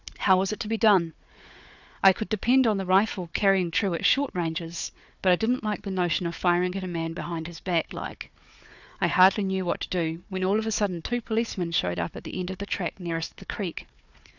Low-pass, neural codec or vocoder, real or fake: 7.2 kHz; codec, 16 kHz, 4 kbps, FunCodec, trained on Chinese and English, 50 frames a second; fake